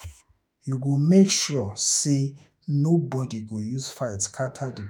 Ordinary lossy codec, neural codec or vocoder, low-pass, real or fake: none; autoencoder, 48 kHz, 32 numbers a frame, DAC-VAE, trained on Japanese speech; none; fake